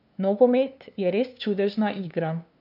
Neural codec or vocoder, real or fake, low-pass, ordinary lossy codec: codec, 16 kHz, 2 kbps, FunCodec, trained on LibriTTS, 25 frames a second; fake; 5.4 kHz; none